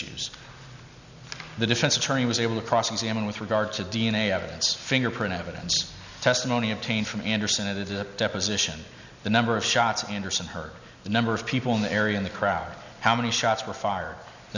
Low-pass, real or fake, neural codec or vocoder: 7.2 kHz; real; none